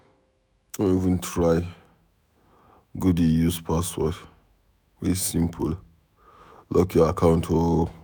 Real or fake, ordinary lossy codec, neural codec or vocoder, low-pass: fake; none; autoencoder, 48 kHz, 128 numbers a frame, DAC-VAE, trained on Japanese speech; none